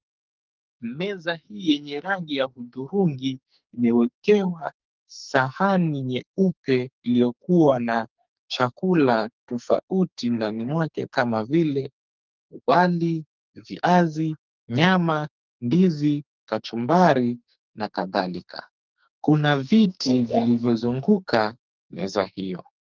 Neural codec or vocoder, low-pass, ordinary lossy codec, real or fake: codec, 44.1 kHz, 2.6 kbps, SNAC; 7.2 kHz; Opus, 32 kbps; fake